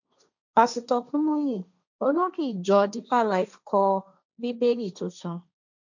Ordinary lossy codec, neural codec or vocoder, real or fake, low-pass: none; codec, 16 kHz, 1.1 kbps, Voila-Tokenizer; fake; 7.2 kHz